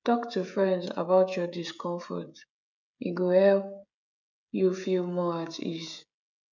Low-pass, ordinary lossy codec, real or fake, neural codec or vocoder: 7.2 kHz; none; fake; codec, 16 kHz, 16 kbps, FreqCodec, smaller model